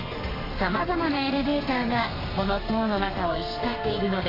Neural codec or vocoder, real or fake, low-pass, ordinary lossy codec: codec, 32 kHz, 1.9 kbps, SNAC; fake; 5.4 kHz; AAC, 24 kbps